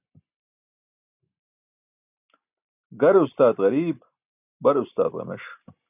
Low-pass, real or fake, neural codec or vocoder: 3.6 kHz; real; none